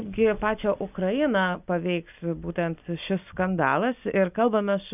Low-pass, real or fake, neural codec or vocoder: 3.6 kHz; real; none